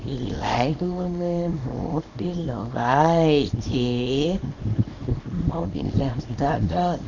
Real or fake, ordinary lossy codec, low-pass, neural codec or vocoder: fake; none; 7.2 kHz; codec, 24 kHz, 0.9 kbps, WavTokenizer, small release